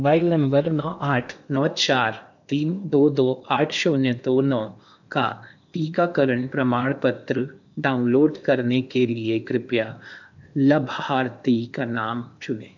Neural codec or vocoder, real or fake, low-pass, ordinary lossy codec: codec, 16 kHz in and 24 kHz out, 0.8 kbps, FocalCodec, streaming, 65536 codes; fake; 7.2 kHz; none